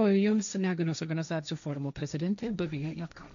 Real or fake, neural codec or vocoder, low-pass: fake; codec, 16 kHz, 1.1 kbps, Voila-Tokenizer; 7.2 kHz